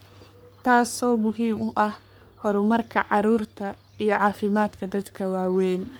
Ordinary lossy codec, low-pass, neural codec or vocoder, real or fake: none; none; codec, 44.1 kHz, 3.4 kbps, Pupu-Codec; fake